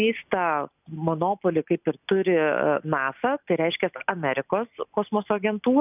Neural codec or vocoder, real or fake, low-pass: none; real; 3.6 kHz